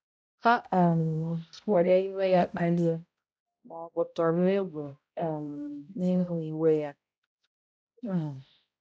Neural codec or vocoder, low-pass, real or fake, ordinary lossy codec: codec, 16 kHz, 0.5 kbps, X-Codec, HuBERT features, trained on balanced general audio; none; fake; none